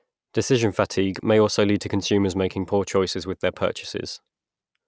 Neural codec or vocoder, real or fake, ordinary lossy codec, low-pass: none; real; none; none